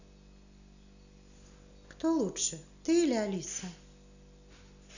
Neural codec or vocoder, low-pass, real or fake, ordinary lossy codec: none; 7.2 kHz; real; none